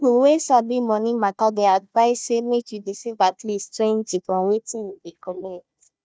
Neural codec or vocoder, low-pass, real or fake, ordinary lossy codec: codec, 16 kHz, 1 kbps, FunCodec, trained on Chinese and English, 50 frames a second; none; fake; none